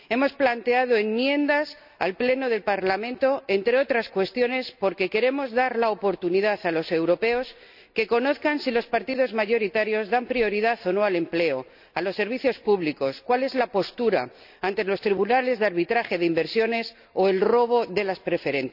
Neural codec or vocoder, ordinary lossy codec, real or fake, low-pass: none; none; real; 5.4 kHz